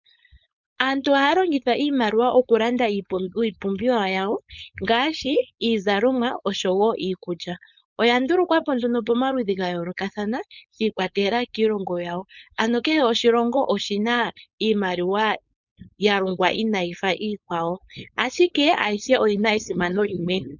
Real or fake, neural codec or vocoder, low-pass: fake; codec, 16 kHz, 4.8 kbps, FACodec; 7.2 kHz